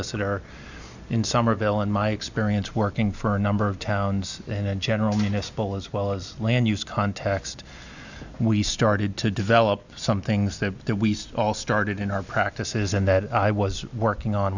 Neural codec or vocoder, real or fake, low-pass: none; real; 7.2 kHz